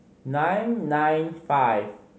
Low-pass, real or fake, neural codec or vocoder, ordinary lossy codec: none; real; none; none